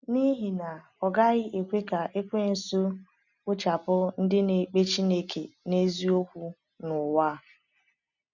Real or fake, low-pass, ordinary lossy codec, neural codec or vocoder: real; 7.2 kHz; none; none